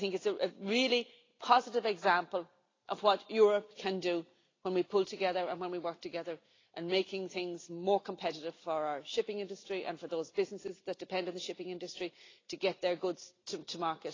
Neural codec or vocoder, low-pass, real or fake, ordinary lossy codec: none; 7.2 kHz; real; AAC, 32 kbps